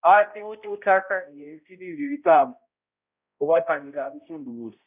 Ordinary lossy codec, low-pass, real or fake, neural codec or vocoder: none; 3.6 kHz; fake; codec, 16 kHz, 0.5 kbps, X-Codec, HuBERT features, trained on balanced general audio